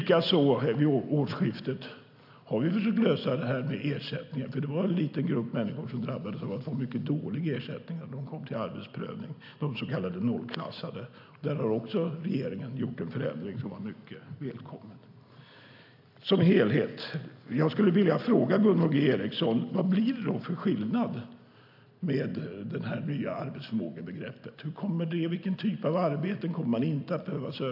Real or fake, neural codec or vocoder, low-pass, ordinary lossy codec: real; none; 5.4 kHz; AAC, 48 kbps